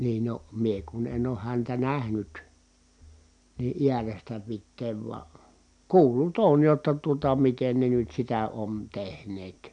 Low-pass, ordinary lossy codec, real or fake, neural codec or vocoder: 9.9 kHz; MP3, 96 kbps; real; none